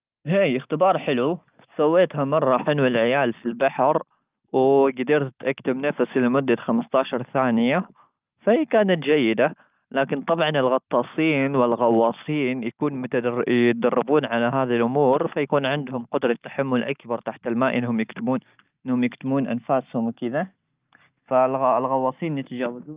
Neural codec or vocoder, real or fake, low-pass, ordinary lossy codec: none; real; 3.6 kHz; Opus, 24 kbps